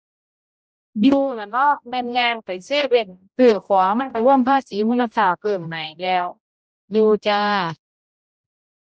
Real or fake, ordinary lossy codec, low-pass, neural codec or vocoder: fake; none; none; codec, 16 kHz, 0.5 kbps, X-Codec, HuBERT features, trained on general audio